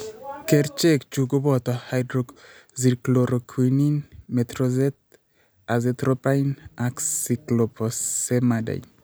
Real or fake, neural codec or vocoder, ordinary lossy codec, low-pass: real; none; none; none